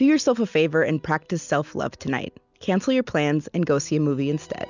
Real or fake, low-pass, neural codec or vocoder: real; 7.2 kHz; none